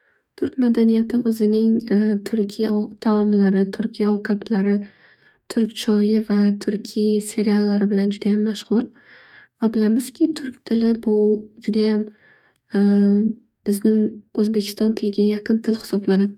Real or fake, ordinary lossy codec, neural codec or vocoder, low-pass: fake; none; codec, 44.1 kHz, 2.6 kbps, DAC; 19.8 kHz